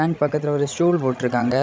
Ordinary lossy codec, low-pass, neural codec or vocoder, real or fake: none; none; codec, 16 kHz, 16 kbps, FreqCodec, larger model; fake